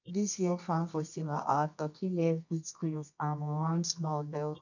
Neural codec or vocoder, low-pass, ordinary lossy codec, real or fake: codec, 24 kHz, 0.9 kbps, WavTokenizer, medium music audio release; 7.2 kHz; none; fake